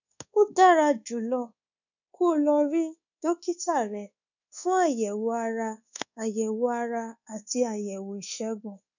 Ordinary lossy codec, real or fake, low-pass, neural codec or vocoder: none; fake; 7.2 kHz; codec, 24 kHz, 1.2 kbps, DualCodec